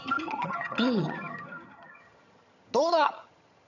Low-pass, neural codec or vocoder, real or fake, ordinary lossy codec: 7.2 kHz; vocoder, 22.05 kHz, 80 mel bands, HiFi-GAN; fake; none